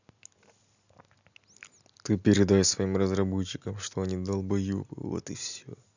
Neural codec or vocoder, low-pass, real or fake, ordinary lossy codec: none; 7.2 kHz; real; none